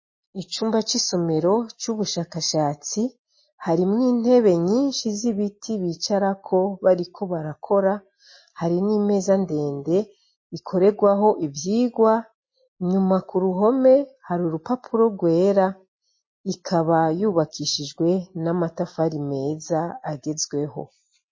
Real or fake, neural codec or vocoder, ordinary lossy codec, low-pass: real; none; MP3, 32 kbps; 7.2 kHz